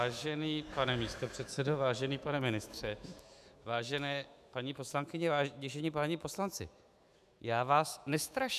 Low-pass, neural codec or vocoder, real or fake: 14.4 kHz; autoencoder, 48 kHz, 128 numbers a frame, DAC-VAE, trained on Japanese speech; fake